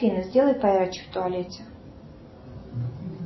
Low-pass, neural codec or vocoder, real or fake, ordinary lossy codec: 7.2 kHz; none; real; MP3, 24 kbps